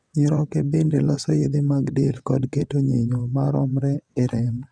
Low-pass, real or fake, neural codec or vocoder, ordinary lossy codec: 9.9 kHz; fake; vocoder, 22.05 kHz, 80 mel bands, WaveNeXt; none